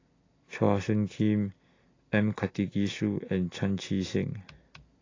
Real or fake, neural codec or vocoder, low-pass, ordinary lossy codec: real; none; 7.2 kHz; AAC, 32 kbps